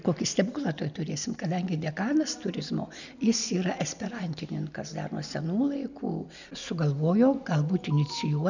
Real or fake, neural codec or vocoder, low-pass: real; none; 7.2 kHz